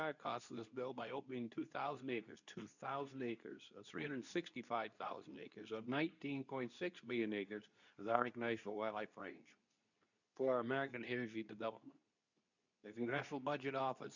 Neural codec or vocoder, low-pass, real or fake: codec, 24 kHz, 0.9 kbps, WavTokenizer, medium speech release version 2; 7.2 kHz; fake